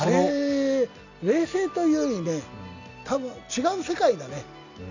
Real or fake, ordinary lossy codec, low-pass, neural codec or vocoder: real; none; 7.2 kHz; none